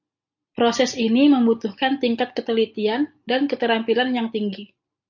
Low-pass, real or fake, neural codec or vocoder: 7.2 kHz; real; none